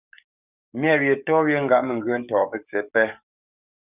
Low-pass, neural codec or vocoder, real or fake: 3.6 kHz; codec, 44.1 kHz, 7.8 kbps, DAC; fake